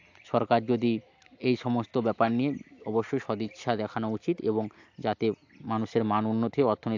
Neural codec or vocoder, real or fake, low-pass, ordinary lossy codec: none; real; 7.2 kHz; AAC, 48 kbps